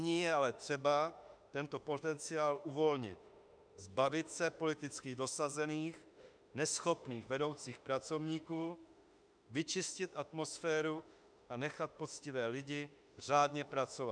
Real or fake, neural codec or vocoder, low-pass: fake; autoencoder, 48 kHz, 32 numbers a frame, DAC-VAE, trained on Japanese speech; 9.9 kHz